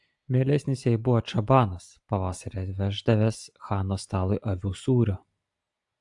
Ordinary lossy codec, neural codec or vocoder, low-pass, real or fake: AAC, 64 kbps; none; 10.8 kHz; real